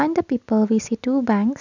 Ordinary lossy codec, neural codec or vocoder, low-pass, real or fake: none; none; 7.2 kHz; real